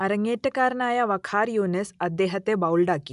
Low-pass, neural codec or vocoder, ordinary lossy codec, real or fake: 10.8 kHz; none; none; real